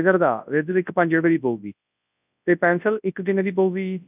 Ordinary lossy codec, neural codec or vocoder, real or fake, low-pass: none; codec, 24 kHz, 0.9 kbps, WavTokenizer, large speech release; fake; 3.6 kHz